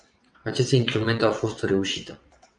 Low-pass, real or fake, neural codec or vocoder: 9.9 kHz; fake; vocoder, 22.05 kHz, 80 mel bands, WaveNeXt